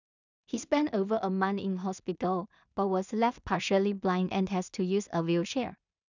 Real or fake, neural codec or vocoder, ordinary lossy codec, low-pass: fake; codec, 16 kHz in and 24 kHz out, 0.4 kbps, LongCat-Audio-Codec, two codebook decoder; none; 7.2 kHz